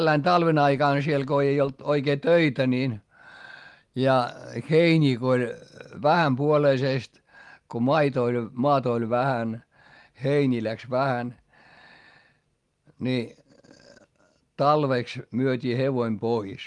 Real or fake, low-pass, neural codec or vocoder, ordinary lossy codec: real; 10.8 kHz; none; Opus, 24 kbps